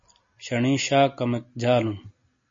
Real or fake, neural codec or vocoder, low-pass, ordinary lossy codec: real; none; 7.2 kHz; MP3, 32 kbps